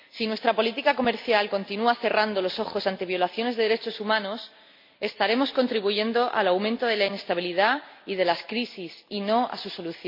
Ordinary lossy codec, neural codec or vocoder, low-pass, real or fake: MP3, 32 kbps; none; 5.4 kHz; real